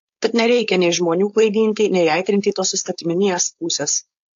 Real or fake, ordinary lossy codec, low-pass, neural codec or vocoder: fake; AAC, 48 kbps; 7.2 kHz; codec, 16 kHz, 4.8 kbps, FACodec